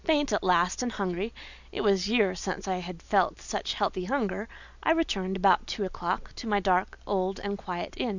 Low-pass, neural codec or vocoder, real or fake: 7.2 kHz; none; real